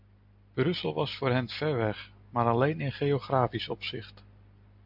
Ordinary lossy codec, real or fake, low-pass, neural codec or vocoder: MP3, 48 kbps; real; 5.4 kHz; none